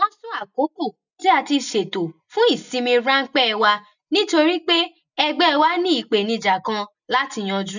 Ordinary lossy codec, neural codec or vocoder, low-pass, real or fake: none; none; 7.2 kHz; real